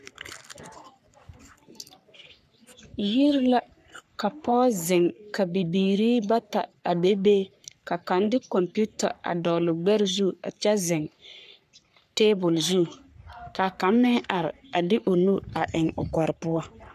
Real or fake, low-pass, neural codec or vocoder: fake; 14.4 kHz; codec, 44.1 kHz, 3.4 kbps, Pupu-Codec